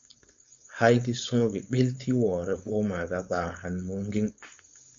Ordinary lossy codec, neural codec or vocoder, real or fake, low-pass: MP3, 48 kbps; codec, 16 kHz, 4.8 kbps, FACodec; fake; 7.2 kHz